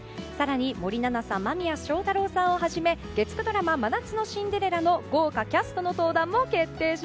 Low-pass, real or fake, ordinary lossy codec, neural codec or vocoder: none; real; none; none